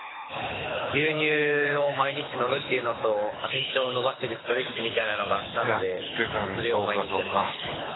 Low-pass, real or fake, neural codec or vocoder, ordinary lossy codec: 7.2 kHz; fake; codec, 24 kHz, 6 kbps, HILCodec; AAC, 16 kbps